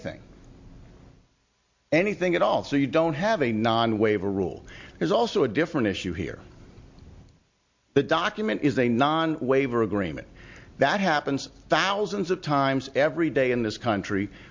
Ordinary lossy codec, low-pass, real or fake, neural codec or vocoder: MP3, 48 kbps; 7.2 kHz; real; none